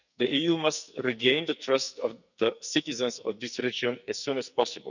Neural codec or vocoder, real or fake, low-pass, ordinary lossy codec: codec, 44.1 kHz, 2.6 kbps, SNAC; fake; 7.2 kHz; none